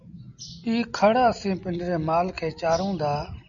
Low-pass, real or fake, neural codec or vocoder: 7.2 kHz; real; none